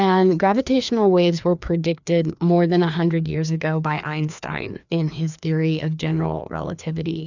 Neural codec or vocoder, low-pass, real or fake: codec, 16 kHz, 2 kbps, FreqCodec, larger model; 7.2 kHz; fake